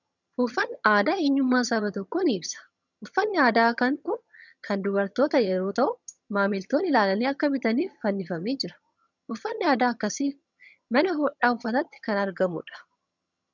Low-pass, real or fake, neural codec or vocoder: 7.2 kHz; fake; vocoder, 22.05 kHz, 80 mel bands, HiFi-GAN